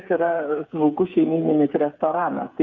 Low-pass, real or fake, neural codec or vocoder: 7.2 kHz; fake; codec, 16 kHz, 8 kbps, FreqCodec, smaller model